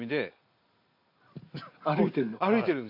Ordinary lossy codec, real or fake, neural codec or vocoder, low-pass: MP3, 48 kbps; real; none; 5.4 kHz